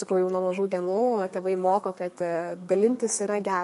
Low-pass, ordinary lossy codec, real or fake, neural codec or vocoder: 14.4 kHz; MP3, 48 kbps; fake; codec, 32 kHz, 1.9 kbps, SNAC